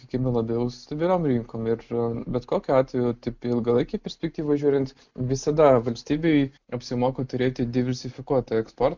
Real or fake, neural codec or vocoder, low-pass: real; none; 7.2 kHz